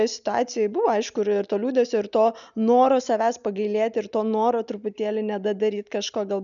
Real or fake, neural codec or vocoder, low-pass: real; none; 7.2 kHz